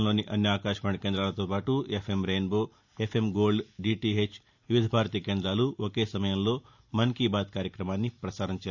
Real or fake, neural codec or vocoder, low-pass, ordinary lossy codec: real; none; 7.2 kHz; none